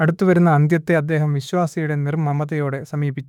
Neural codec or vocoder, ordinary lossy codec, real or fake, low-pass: autoencoder, 48 kHz, 32 numbers a frame, DAC-VAE, trained on Japanese speech; none; fake; 19.8 kHz